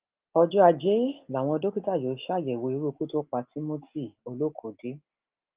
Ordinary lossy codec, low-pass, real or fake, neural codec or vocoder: Opus, 32 kbps; 3.6 kHz; real; none